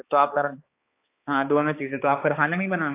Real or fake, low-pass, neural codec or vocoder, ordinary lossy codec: fake; 3.6 kHz; codec, 16 kHz, 4 kbps, X-Codec, HuBERT features, trained on balanced general audio; AAC, 24 kbps